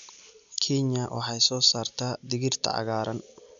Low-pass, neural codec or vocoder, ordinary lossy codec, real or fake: 7.2 kHz; none; none; real